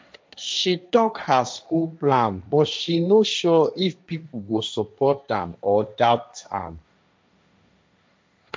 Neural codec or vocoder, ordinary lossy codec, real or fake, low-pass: codec, 16 kHz, 1.1 kbps, Voila-Tokenizer; none; fake; none